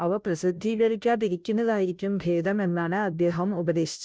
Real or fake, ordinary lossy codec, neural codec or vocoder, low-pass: fake; none; codec, 16 kHz, 0.5 kbps, FunCodec, trained on Chinese and English, 25 frames a second; none